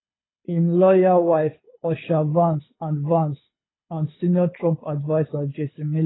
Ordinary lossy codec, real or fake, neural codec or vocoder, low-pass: AAC, 16 kbps; fake; codec, 24 kHz, 6 kbps, HILCodec; 7.2 kHz